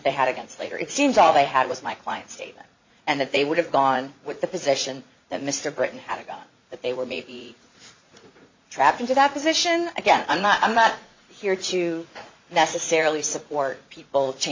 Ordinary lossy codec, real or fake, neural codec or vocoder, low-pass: MP3, 48 kbps; fake; vocoder, 44.1 kHz, 80 mel bands, Vocos; 7.2 kHz